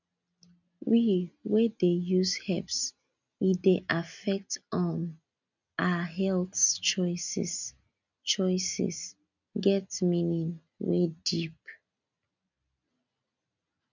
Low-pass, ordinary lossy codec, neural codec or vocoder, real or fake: 7.2 kHz; none; none; real